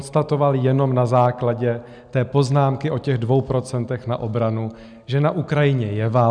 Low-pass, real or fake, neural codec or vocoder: 9.9 kHz; real; none